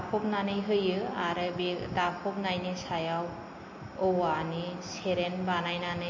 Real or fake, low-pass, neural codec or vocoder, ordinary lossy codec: real; 7.2 kHz; none; MP3, 32 kbps